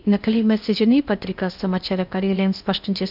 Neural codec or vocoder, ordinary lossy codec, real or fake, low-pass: codec, 16 kHz in and 24 kHz out, 0.8 kbps, FocalCodec, streaming, 65536 codes; none; fake; 5.4 kHz